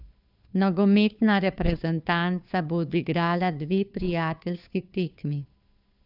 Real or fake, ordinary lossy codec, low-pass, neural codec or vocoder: fake; none; 5.4 kHz; codec, 16 kHz, 2 kbps, FunCodec, trained on Chinese and English, 25 frames a second